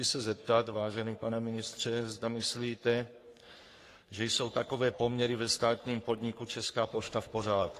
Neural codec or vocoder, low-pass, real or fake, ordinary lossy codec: codec, 44.1 kHz, 3.4 kbps, Pupu-Codec; 14.4 kHz; fake; AAC, 48 kbps